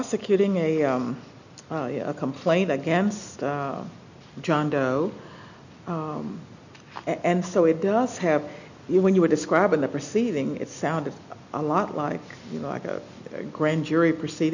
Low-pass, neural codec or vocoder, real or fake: 7.2 kHz; none; real